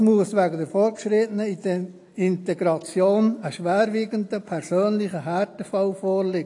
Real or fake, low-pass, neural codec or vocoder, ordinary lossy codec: real; 10.8 kHz; none; AAC, 48 kbps